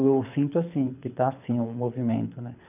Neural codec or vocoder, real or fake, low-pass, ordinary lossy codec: codec, 24 kHz, 6 kbps, HILCodec; fake; 3.6 kHz; none